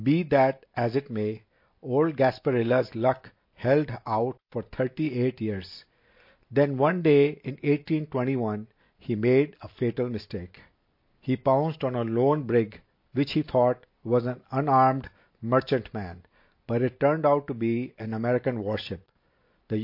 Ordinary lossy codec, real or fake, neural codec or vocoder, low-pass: MP3, 32 kbps; real; none; 5.4 kHz